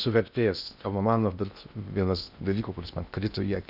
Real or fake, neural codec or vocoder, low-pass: fake; codec, 16 kHz in and 24 kHz out, 0.6 kbps, FocalCodec, streaming, 2048 codes; 5.4 kHz